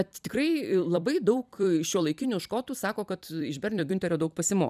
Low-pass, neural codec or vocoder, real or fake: 14.4 kHz; vocoder, 44.1 kHz, 128 mel bands every 256 samples, BigVGAN v2; fake